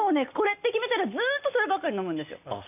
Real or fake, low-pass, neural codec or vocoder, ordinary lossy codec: real; 3.6 kHz; none; none